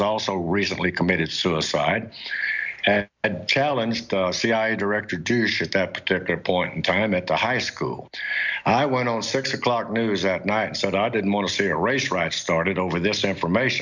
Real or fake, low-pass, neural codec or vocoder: real; 7.2 kHz; none